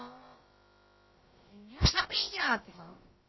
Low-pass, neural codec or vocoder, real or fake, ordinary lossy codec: 7.2 kHz; codec, 16 kHz, about 1 kbps, DyCAST, with the encoder's durations; fake; MP3, 24 kbps